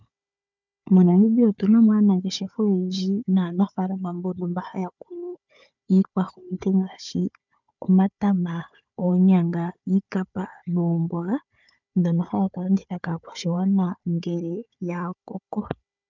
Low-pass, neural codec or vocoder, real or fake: 7.2 kHz; codec, 16 kHz, 4 kbps, FunCodec, trained on Chinese and English, 50 frames a second; fake